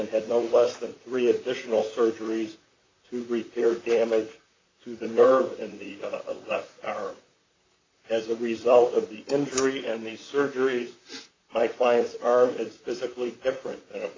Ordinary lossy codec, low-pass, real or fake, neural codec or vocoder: AAC, 32 kbps; 7.2 kHz; fake; vocoder, 44.1 kHz, 128 mel bands, Pupu-Vocoder